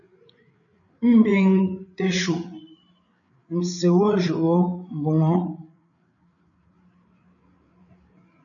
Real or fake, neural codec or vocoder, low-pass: fake; codec, 16 kHz, 8 kbps, FreqCodec, larger model; 7.2 kHz